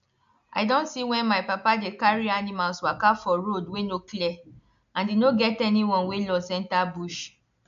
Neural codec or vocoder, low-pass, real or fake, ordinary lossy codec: none; 7.2 kHz; real; MP3, 64 kbps